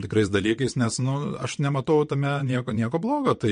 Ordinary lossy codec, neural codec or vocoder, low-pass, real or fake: MP3, 48 kbps; vocoder, 22.05 kHz, 80 mel bands, WaveNeXt; 9.9 kHz; fake